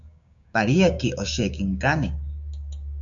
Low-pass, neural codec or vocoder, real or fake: 7.2 kHz; codec, 16 kHz, 6 kbps, DAC; fake